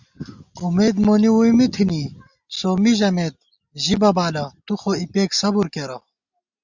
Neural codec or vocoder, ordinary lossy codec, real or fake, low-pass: none; Opus, 64 kbps; real; 7.2 kHz